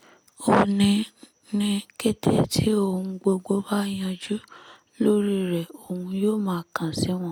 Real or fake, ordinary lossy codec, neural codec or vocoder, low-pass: real; none; none; none